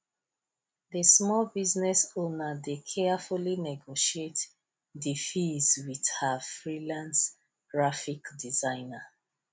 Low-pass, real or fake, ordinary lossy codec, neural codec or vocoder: none; real; none; none